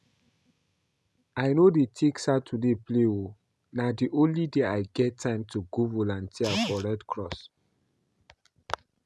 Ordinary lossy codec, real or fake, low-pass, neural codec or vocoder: none; real; none; none